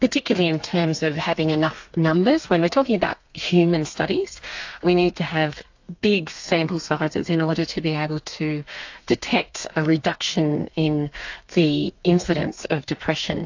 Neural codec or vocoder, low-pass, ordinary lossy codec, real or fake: codec, 32 kHz, 1.9 kbps, SNAC; 7.2 kHz; AAC, 48 kbps; fake